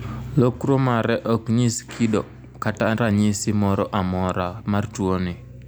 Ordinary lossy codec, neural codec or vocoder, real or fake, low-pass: none; none; real; none